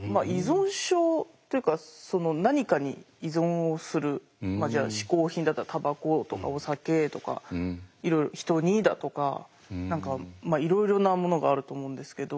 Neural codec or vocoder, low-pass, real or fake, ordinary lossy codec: none; none; real; none